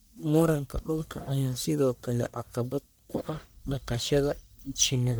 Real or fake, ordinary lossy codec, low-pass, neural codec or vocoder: fake; none; none; codec, 44.1 kHz, 1.7 kbps, Pupu-Codec